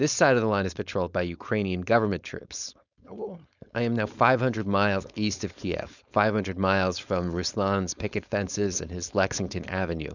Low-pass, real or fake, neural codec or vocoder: 7.2 kHz; fake; codec, 16 kHz, 4.8 kbps, FACodec